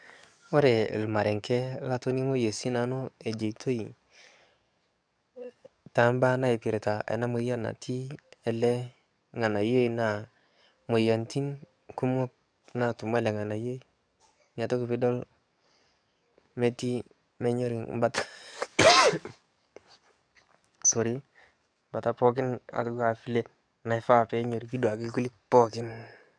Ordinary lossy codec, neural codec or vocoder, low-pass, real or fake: none; codec, 44.1 kHz, 7.8 kbps, DAC; 9.9 kHz; fake